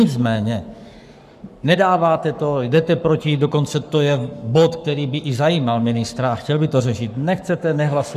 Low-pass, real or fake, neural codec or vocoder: 14.4 kHz; fake; codec, 44.1 kHz, 7.8 kbps, Pupu-Codec